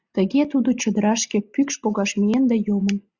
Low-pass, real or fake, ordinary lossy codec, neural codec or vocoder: 7.2 kHz; real; Opus, 64 kbps; none